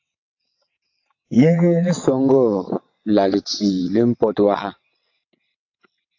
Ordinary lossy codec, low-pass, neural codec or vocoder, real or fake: AAC, 48 kbps; 7.2 kHz; vocoder, 22.05 kHz, 80 mel bands, WaveNeXt; fake